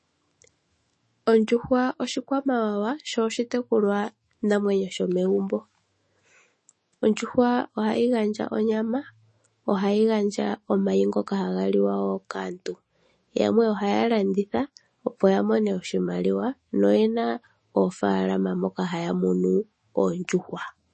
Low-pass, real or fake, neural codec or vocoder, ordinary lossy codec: 10.8 kHz; fake; autoencoder, 48 kHz, 128 numbers a frame, DAC-VAE, trained on Japanese speech; MP3, 32 kbps